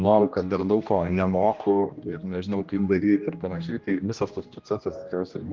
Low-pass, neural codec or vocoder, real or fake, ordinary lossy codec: 7.2 kHz; codec, 16 kHz, 1 kbps, X-Codec, HuBERT features, trained on general audio; fake; Opus, 24 kbps